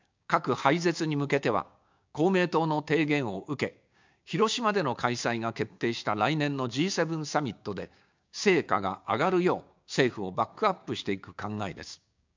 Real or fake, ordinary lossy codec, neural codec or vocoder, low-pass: fake; MP3, 64 kbps; codec, 16 kHz, 6 kbps, DAC; 7.2 kHz